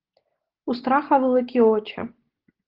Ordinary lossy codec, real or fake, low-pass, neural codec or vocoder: Opus, 16 kbps; real; 5.4 kHz; none